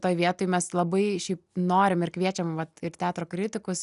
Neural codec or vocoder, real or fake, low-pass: none; real; 10.8 kHz